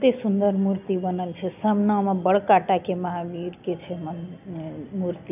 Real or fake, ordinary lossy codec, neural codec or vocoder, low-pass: real; none; none; 3.6 kHz